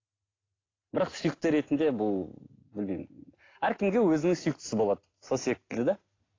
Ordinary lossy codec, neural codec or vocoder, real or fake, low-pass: AAC, 32 kbps; none; real; 7.2 kHz